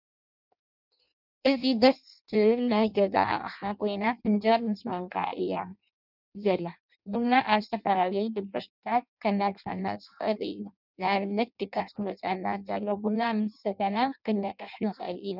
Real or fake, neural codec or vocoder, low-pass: fake; codec, 16 kHz in and 24 kHz out, 0.6 kbps, FireRedTTS-2 codec; 5.4 kHz